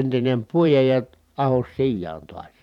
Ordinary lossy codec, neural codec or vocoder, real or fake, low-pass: none; vocoder, 44.1 kHz, 128 mel bands every 512 samples, BigVGAN v2; fake; 19.8 kHz